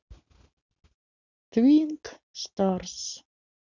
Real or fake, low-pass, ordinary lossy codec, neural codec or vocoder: real; 7.2 kHz; none; none